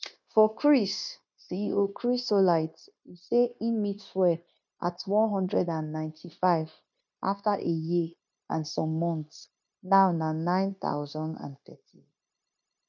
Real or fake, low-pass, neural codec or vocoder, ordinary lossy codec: fake; 7.2 kHz; codec, 16 kHz, 0.9 kbps, LongCat-Audio-Codec; none